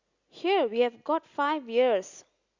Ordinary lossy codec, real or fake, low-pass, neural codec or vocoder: Opus, 64 kbps; real; 7.2 kHz; none